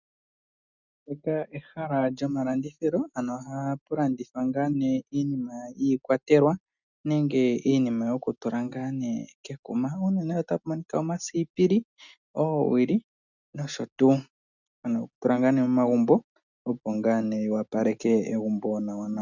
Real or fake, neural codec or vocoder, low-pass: real; none; 7.2 kHz